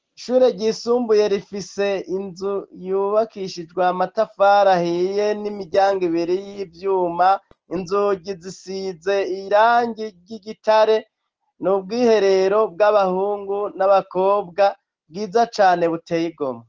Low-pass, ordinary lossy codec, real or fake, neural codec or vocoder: 7.2 kHz; Opus, 16 kbps; real; none